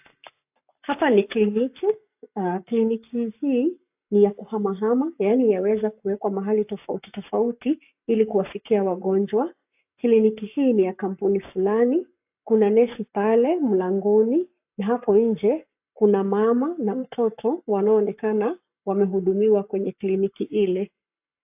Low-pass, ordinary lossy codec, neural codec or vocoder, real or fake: 3.6 kHz; AAC, 32 kbps; codec, 44.1 kHz, 7.8 kbps, Pupu-Codec; fake